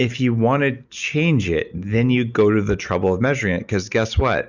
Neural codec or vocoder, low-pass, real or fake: none; 7.2 kHz; real